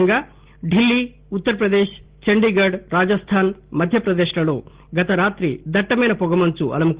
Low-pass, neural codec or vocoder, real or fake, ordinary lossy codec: 3.6 kHz; none; real; Opus, 16 kbps